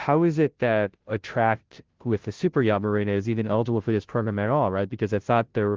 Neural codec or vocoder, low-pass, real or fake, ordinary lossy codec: codec, 16 kHz, 0.5 kbps, FunCodec, trained on Chinese and English, 25 frames a second; 7.2 kHz; fake; Opus, 16 kbps